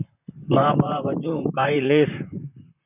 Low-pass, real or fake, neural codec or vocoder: 3.6 kHz; fake; vocoder, 44.1 kHz, 128 mel bands, Pupu-Vocoder